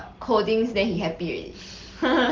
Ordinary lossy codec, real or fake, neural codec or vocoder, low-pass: Opus, 16 kbps; real; none; 7.2 kHz